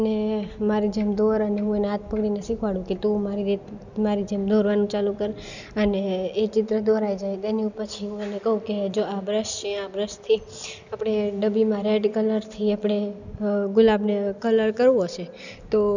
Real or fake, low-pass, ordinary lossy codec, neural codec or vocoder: real; 7.2 kHz; none; none